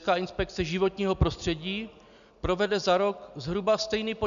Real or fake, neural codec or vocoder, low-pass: real; none; 7.2 kHz